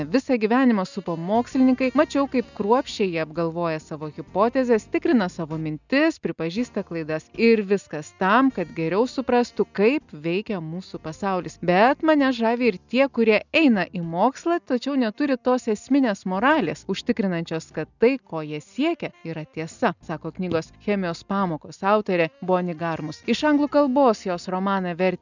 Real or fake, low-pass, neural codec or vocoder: real; 7.2 kHz; none